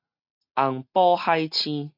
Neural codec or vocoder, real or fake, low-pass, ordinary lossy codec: none; real; 5.4 kHz; MP3, 48 kbps